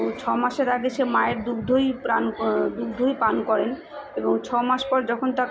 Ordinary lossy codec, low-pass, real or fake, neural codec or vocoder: none; none; real; none